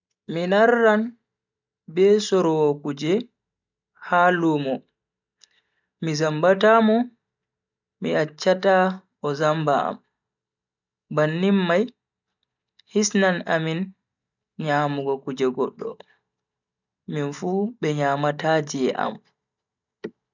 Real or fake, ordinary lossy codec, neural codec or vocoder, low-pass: real; none; none; 7.2 kHz